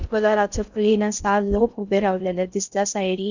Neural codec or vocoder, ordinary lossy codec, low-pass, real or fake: codec, 16 kHz in and 24 kHz out, 0.6 kbps, FocalCodec, streaming, 2048 codes; none; 7.2 kHz; fake